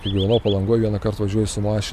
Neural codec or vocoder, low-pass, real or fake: none; 14.4 kHz; real